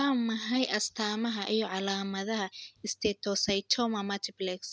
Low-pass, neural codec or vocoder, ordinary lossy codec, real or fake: none; none; none; real